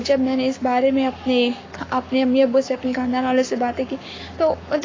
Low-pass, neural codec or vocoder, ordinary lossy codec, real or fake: 7.2 kHz; codec, 44.1 kHz, 7.8 kbps, Pupu-Codec; AAC, 32 kbps; fake